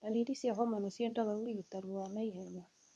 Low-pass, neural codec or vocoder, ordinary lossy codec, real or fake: none; codec, 24 kHz, 0.9 kbps, WavTokenizer, medium speech release version 2; none; fake